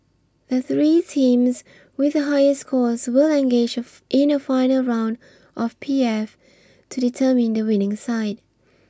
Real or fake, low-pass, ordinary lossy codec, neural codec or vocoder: real; none; none; none